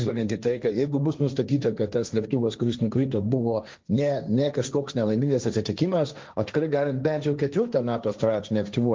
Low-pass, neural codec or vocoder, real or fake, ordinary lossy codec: 7.2 kHz; codec, 16 kHz, 1.1 kbps, Voila-Tokenizer; fake; Opus, 32 kbps